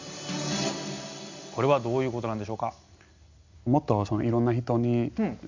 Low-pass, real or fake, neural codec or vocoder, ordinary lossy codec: 7.2 kHz; real; none; MP3, 64 kbps